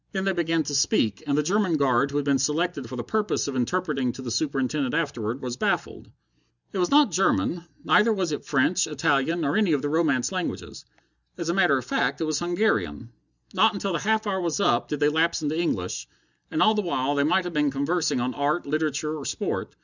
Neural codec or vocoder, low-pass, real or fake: none; 7.2 kHz; real